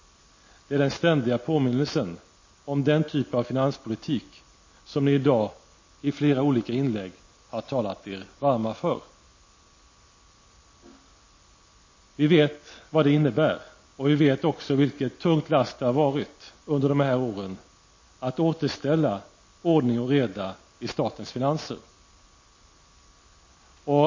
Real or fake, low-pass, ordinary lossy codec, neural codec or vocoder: real; 7.2 kHz; MP3, 32 kbps; none